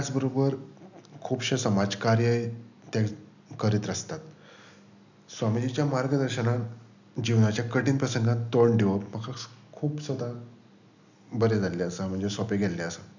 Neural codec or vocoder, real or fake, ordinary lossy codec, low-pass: none; real; none; 7.2 kHz